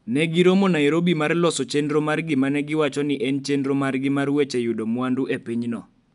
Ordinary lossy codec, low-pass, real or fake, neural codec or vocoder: none; 10.8 kHz; real; none